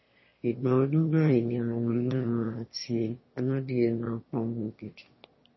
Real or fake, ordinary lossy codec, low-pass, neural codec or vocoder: fake; MP3, 24 kbps; 7.2 kHz; autoencoder, 22.05 kHz, a latent of 192 numbers a frame, VITS, trained on one speaker